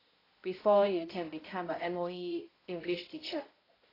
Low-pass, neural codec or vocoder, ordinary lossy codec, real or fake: 5.4 kHz; codec, 16 kHz, 0.5 kbps, X-Codec, HuBERT features, trained on balanced general audio; AAC, 24 kbps; fake